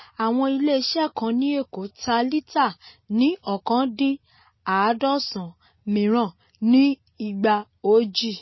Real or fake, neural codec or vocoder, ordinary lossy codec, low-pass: real; none; MP3, 24 kbps; 7.2 kHz